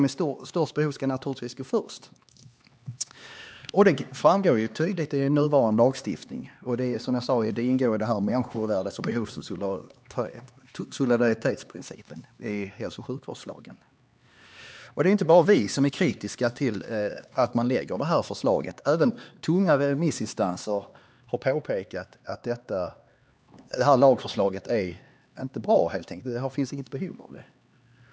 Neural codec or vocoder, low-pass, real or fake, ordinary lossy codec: codec, 16 kHz, 2 kbps, X-Codec, HuBERT features, trained on LibriSpeech; none; fake; none